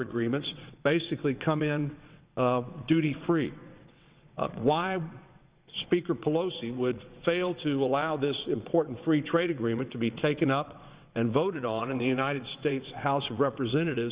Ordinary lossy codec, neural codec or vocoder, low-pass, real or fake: Opus, 64 kbps; vocoder, 22.05 kHz, 80 mel bands, WaveNeXt; 3.6 kHz; fake